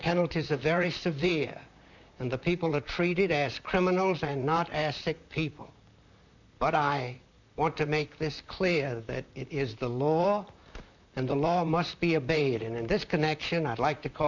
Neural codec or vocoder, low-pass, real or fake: vocoder, 44.1 kHz, 128 mel bands, Pupu-Vocoder; 7.2 kHz; fake